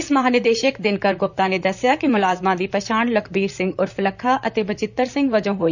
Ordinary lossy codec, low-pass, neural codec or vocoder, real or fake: none; 7.2 kHz; vocoder, 44.1 kHz, 128 mel bands, Pupu-Vocoder; fake